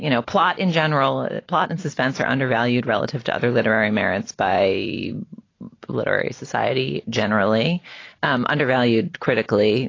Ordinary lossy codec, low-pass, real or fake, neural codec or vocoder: AAC, 32 kbps; 7.2 kHz; real; none